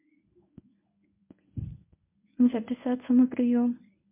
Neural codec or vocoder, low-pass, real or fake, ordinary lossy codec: codec, 24 kHz, 0.9 kbps, WavTokenizer, medium speech release version 1; 3.6 kHz; fake; MP3, 32 kbps